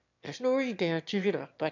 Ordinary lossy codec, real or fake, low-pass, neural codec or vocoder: none; fake; 7.2 kHz; autoencoder, 22.05 kHz, a latent of 192 numbers a frame, VITS, trained on one speaker